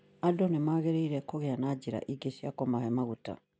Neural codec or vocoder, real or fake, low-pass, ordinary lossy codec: none; real; none; none